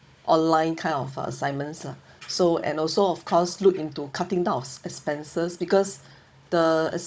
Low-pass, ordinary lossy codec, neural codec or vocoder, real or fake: none; none; codec, 16 kHz, 16 kbps, FunCodec, trained on Chinese and English, 50 frames a second; fake